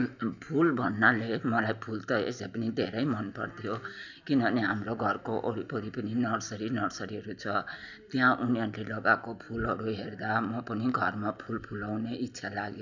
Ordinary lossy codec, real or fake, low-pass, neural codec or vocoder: none; real; 7.2 kHz; none